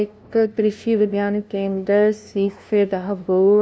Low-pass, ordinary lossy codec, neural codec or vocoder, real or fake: none; none; codec, 16 kHz, 0.5 kbps, FunCodec, trained on LibriTTS, 25 frames a second; fake